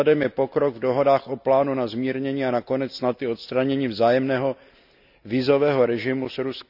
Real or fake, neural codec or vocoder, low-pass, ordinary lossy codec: real; none; 5.4 kHz; none